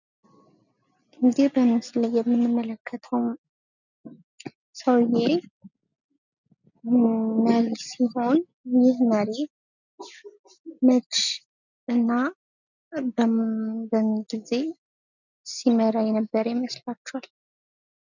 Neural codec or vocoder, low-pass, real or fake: none; 7.2 kHz; real